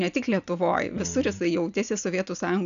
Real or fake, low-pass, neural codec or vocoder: real; 7.2 kHz; none